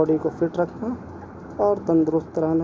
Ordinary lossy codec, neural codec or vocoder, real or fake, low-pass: none; none; real; none